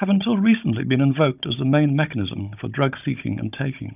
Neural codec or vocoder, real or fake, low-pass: codec, 16 kHz, 16 kbps, FunCodec, trained on Chinese and English, 50 frames a second; fake; 3.6 kHz